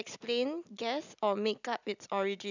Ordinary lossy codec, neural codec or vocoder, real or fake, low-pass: none; none; real; 7.2 kHz